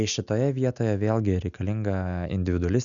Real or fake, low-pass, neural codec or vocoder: real; 7.2 kHz; none